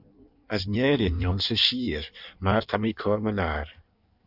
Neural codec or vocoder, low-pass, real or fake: codec, 16 kHz in and 24 kHz out, 1.1 kbps, FireRedTTS-2 codec; 5.4 kHz; fake